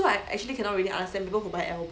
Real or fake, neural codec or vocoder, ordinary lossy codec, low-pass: real; none; none; none